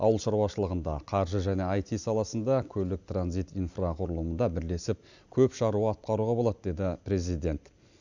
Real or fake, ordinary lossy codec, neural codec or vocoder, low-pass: real; none; none; 7.2 kHz